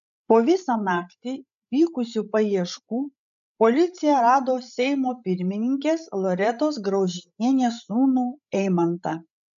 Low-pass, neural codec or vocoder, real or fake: 7.2 kHz; codec, 16 kHz, 16 kbps, FreqCodec, larger model; fake